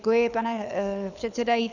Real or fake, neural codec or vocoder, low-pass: fake; codec, 24 kHz, 6 kbps, HILCodec; 7.2 kHz